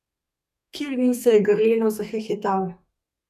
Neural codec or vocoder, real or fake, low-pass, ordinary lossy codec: codec, 32 kHz, 1.9 kbps, SNAC; fake; 14.4 kHz; none